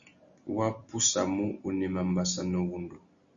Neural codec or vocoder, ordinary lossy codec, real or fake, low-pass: none; Opus, 64 kbps; real; 7.2 kHz